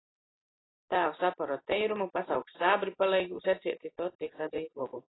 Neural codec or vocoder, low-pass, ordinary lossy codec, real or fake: none; 7.2 kHz; AAC, 16 kbps; real